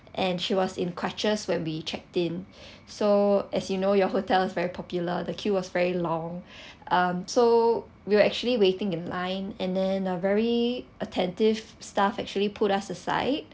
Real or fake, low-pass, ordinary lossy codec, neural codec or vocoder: real; none; none; none